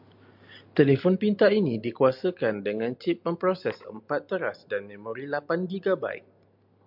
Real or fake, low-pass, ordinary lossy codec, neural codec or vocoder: real; 5.4 kHz; MP3, 48 kbps; none